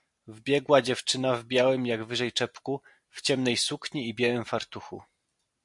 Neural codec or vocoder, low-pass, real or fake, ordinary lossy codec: none; 10.8 kHz; real; MP3, 64 kbps